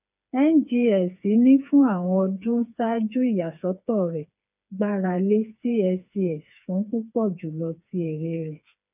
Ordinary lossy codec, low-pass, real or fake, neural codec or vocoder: none; 3.6 kHz; fake; codec, 16 kHz, 4 kbps, FreqCodec, smaller model